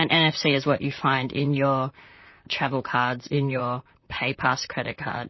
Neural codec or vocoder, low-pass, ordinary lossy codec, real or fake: vocoder, 44.1 kHz, 128 mel bands, Pupu-Vocoder; 7.2 kHz; MP3, 24 kbps; fake